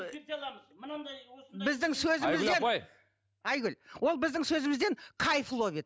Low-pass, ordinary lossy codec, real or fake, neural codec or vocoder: none; none; real; none